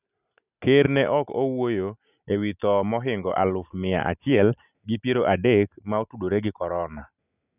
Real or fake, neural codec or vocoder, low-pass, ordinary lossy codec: real; none; 3.6 kHz; none